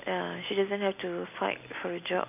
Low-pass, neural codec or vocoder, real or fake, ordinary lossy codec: 3.6 kHz; none; real; none